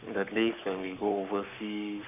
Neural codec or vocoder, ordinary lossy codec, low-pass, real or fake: codec, 44.1 kHz, 7.8 kbps, Pupu-Codec; none; 3.6 kHz; fake